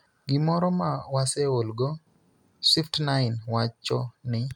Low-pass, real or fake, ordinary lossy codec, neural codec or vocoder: 19.8 kHz; real; none; none